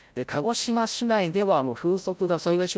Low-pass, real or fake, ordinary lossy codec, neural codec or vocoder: none; fake; none; codec, 16 kHz, 0.5 kbps, FreqCodec, larger model